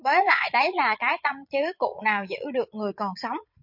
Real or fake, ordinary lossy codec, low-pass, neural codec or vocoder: fake; MP3, 48 kbps; 5.4 kHz; vocoder, 22.05 kHz, 80 mel bands, Vocos